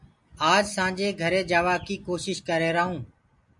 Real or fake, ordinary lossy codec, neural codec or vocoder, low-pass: real; MP3, 64 kbps; none; 10.8 kHz